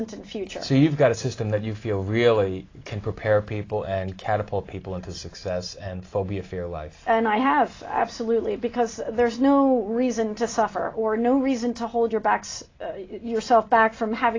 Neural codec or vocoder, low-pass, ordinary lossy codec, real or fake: none; 7.2 kHz; AAC, 32 kbps; real